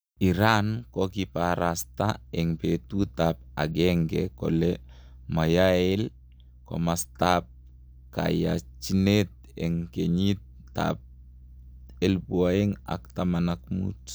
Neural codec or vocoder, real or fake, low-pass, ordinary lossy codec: none; real; none; none